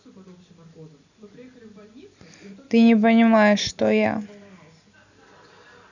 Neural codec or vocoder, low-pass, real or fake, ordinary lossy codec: none; 7.2 kHz; real; none